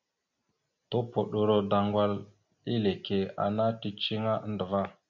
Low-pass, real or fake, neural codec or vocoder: 7.2 kHz; real; none